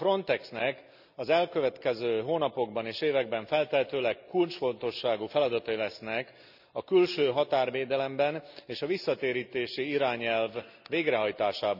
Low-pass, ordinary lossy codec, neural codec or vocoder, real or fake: 5.4 kHz; none; none; real